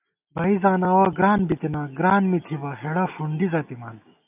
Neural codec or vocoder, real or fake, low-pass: none; real; 3.6 kHz